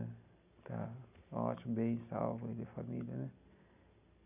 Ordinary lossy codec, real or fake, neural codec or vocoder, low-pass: none; real; none; 3.6 kHz